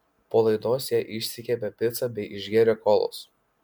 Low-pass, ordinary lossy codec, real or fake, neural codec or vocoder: 19.8 kHz; MP3, 96 kbps; real; none